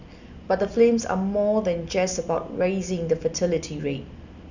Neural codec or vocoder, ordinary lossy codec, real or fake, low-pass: none; none; real; 7.2 kHz